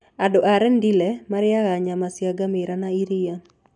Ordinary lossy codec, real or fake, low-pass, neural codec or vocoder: none; real; 10.8 kHz; none